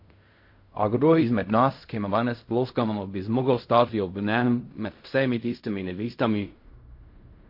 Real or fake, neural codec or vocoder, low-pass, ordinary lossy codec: fake; codec, 16 kHz in and 24 kHz out, 0.4 kbps, LongCat-Audio-Codec, fine tuned four codebook decoder; 5.4 kHz; MP3, 32 kbps